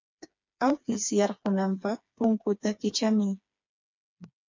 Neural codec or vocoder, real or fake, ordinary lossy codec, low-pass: codec, 16 kHz, 4 kbps, FreqCodec, smaller model; fake; MP3, 64 kbps; 7.2 kHz